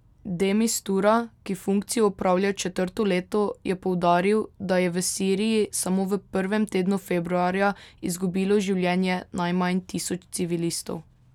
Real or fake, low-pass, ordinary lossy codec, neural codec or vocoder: real; 19.8 kHz; none; none